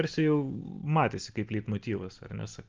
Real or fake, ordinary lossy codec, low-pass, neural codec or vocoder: real; Opus, 24 kbps; 7.2 kHz; none